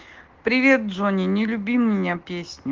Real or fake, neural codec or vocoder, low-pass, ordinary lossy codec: real; none; 7.2 kHz; Opus, 16 kbps